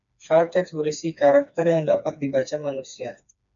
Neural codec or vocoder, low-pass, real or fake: codec, 16 kHz, 2 kbps, FreqCodec, smaller model; 7.2 kHz; fake